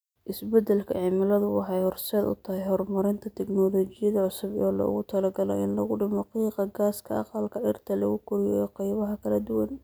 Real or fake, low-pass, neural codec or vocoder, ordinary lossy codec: real; none; none; none